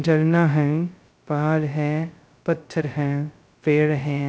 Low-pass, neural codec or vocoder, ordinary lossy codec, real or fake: none; codec, 16 kHz, 0.2 kbps, FocalCodec; none; fake